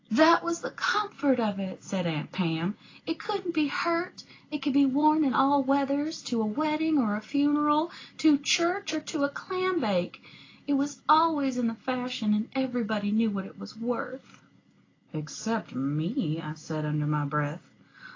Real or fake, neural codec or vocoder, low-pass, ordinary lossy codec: real; none; 7.2 kHz; AAC, 32 kbps